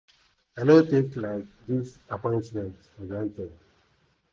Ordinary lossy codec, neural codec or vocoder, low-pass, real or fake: none; none; none; real